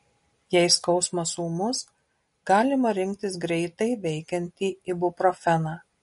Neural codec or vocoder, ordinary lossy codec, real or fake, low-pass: none; MP3, 48 kbps; real; 10.8 kHz